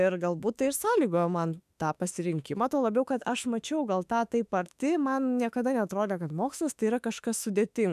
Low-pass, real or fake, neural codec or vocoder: 14.4 kHz; fake; autoencoder, 48 kHz, 32 numbers a frame, DAC-VAE, trained on Japanese speech